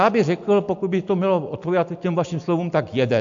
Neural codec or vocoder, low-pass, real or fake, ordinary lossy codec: none; 7.2 kHz; real; AAC, 64 kbps